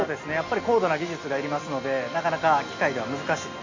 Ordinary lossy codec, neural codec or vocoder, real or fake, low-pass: MP3, 48 kbps; none; real; 7.2 kHz